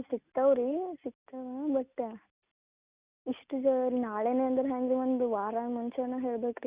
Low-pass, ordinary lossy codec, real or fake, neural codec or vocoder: 3.6 kHz; Opus, 64 kbps; real; none